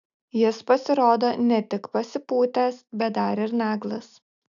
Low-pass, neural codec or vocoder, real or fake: 7.2 kHz; none; real